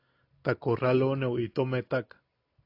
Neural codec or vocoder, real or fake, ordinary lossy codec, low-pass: none; real; AAC, 32 kbps; 5.4 kHz